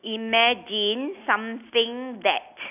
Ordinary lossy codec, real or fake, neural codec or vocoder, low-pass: none; real; none; 3.6 kHz